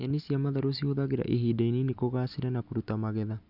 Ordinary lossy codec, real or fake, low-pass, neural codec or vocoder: Opus, 64 kbps; real; 5.4 kHz; none